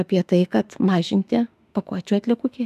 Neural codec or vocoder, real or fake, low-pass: autoencoder, 48 kHz, 32 numbers a frame, DAC-VAE, trained on Japanese speech; fake; 14.4 kHz